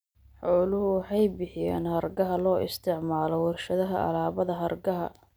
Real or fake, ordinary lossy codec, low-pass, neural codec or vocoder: real; none; none; none